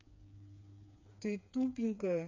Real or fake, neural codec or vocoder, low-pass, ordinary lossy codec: fake; codec, 16 kHz, 4 kbps, FreqCodec, smaller model; 7.2 kHz; MP3, 48 kbps